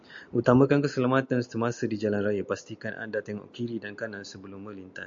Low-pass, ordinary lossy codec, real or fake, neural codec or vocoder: 7.2 kHz; AAC, 64 kbps; real; none